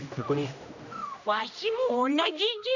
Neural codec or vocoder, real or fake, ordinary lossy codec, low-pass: codec, 16 kHz, 1 kbps, X-Codec, HuBERT features, trained on general audio; fake; none; 7.2 kHz